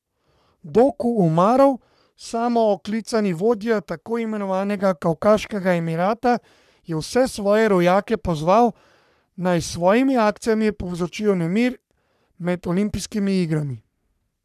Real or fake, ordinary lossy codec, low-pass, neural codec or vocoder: fake; none; 14.4 kHz; codec, 44.1 kHz, 3.4 kbps, Pupu-Codec